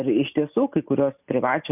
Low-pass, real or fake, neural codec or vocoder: 3.6 kHz; real; none